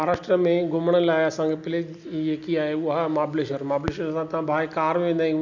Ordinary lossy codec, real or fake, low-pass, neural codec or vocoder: none; real; 7.2 kHz; none